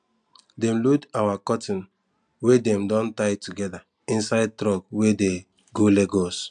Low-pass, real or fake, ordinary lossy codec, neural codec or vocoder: 9.9 kHz; real; none; none